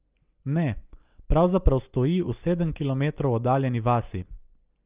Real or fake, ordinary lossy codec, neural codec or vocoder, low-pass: real; Opus, 24 kbps; none; 3.6 kHz